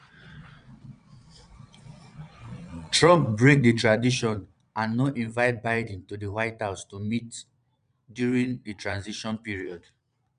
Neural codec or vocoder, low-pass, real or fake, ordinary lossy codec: vocoder, 22.05 kHz, 80 mel bands, Vocos; 9.9 kHz; fake; none